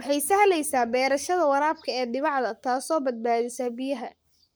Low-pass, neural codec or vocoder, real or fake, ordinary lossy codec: none; codec, 44.1 kHz, 7.8 kbps, Pupu-Codec; fake; none